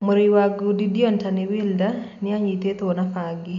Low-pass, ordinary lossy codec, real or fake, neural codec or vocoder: 7.2 kHz; none; real; none